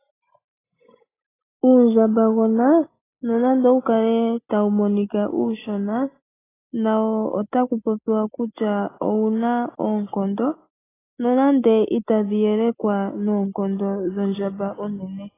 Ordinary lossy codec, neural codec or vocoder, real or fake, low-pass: AAC, 16 kbps; none; real; 3.6 kHz